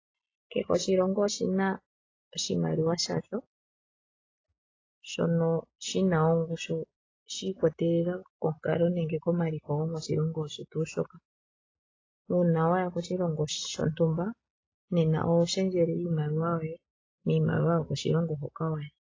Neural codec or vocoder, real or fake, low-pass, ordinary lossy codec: none; real; 7.2 kHz; AAC, 32 kbps